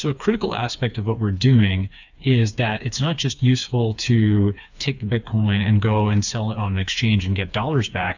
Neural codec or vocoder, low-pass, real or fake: codec, 16 kHz, 4 kbps, FreqCodec, smaller model; 7.2 kHz; fake